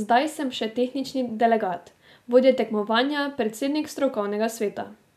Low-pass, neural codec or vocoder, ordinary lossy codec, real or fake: 14.4 kHz; none; none; real